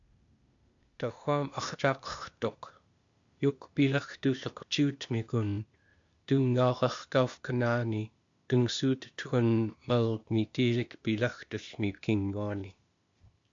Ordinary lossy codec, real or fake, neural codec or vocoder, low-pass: MP3, 64 kbps; fake; codec, 16 kHz, 0.8 kbps, ZipCodec; 7.2 kHz